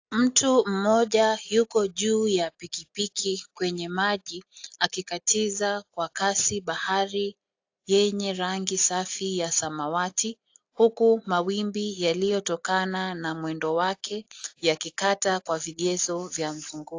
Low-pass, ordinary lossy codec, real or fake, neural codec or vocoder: 7.2 kHz; AAC, 48 kbps; real; none